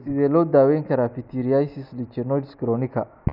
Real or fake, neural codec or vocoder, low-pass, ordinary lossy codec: real; none; 5.4 kHz; none